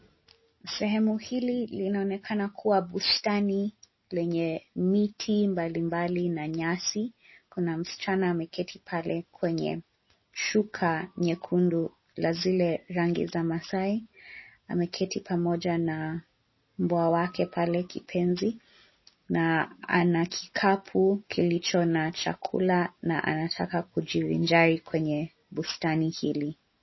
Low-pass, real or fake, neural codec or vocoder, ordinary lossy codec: 7.2 kHz; real; none; MP3, 24 kbps